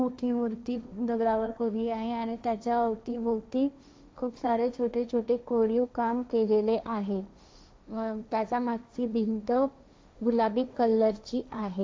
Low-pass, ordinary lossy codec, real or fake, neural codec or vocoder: none; none; fake; codec, 16 kHz, 1.1 kbps, Voila-Tokenizer